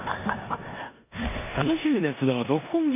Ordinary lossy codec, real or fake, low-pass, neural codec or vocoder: none; fake; 3.6 kHz; codec, 16 kHz in and 24 kHz out, 0.9 kbps, LongCat-Audio-Codec, four codebook decoder